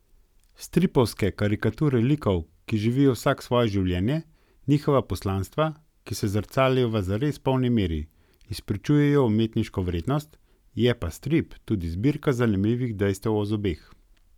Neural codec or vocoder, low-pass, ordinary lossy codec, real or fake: none; 19.8 kHz; none; real